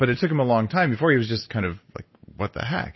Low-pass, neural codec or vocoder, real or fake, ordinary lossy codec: 7.2 kHz; none; real; MP3, 24 kbps